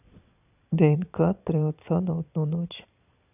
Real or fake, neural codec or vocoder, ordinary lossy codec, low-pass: fake; vocoder, 22.05 kHz, 80 mel bands, Vocos; none; 3.6 kHz